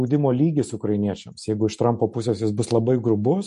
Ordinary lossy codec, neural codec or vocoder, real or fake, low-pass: MP3, 48 kbps; none; real; 10.8 kHz